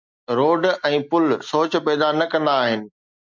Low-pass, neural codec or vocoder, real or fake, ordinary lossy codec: 7.2 kHz; none; real; MP3, 64 kbps